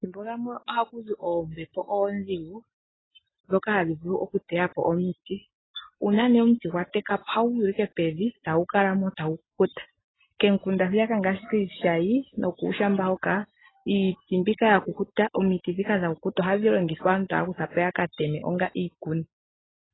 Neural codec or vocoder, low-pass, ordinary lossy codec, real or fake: none; 7.2 kHz; AAC, 16 kbps; real